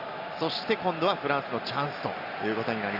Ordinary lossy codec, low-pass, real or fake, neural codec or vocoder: Opus, 64 kbps; 5.4 kHz; real; none